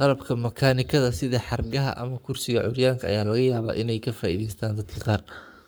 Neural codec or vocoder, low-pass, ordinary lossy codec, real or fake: codec, 44.1 kHz, 7.8 kbps, Pupu-Codec; none; none; fake